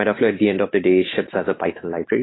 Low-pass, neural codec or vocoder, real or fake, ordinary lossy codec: 7.2 kHz; autoencoder, 48 kHz, 128 numbers a frame, DAC-VAE, trained on Japanese speech; fake; AAC, 16 kbps